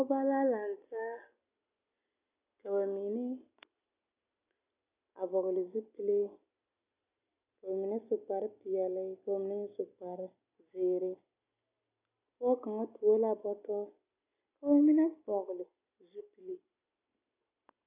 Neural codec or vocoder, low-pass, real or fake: none; 3.6 kHz; real